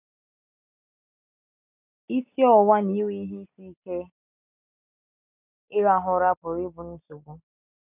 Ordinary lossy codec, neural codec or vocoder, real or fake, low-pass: none; none; real; 3.6 kHz